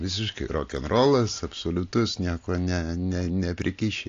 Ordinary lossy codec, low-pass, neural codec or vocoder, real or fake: AAC, 48 kbps; 7.2 kHz; none; real